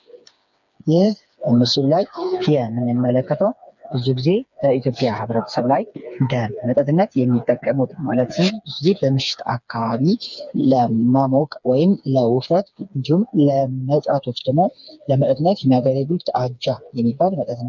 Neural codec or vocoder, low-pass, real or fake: codec, 16 kHz, 4 kbps, FreqCodec, smaller model; 7.2 kHz; fake